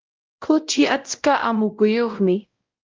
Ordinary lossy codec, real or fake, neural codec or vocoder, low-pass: Opus, 32 kbps; fake; codec, 16 kHz, 0.5 kbps, X-Codec, WavLM features, trained on Multilingual LibriSpeech; 7.2 kHz